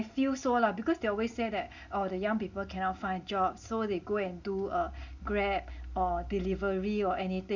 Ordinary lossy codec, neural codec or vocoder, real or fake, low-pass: none; none; real; 7.2 kHz